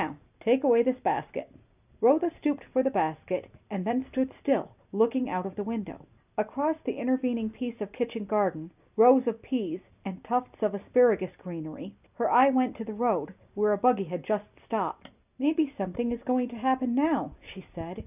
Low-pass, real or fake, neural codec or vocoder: 3.6 kHz; real; none